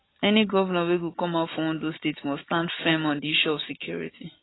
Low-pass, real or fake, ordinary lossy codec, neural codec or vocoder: 7.2 kHz; real; AAC, 16 kbps; none